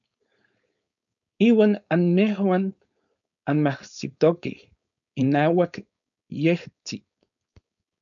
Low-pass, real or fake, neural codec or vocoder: 7.2 kHz; fake; codec, 16 kHz, 4.8 kbps, FACodec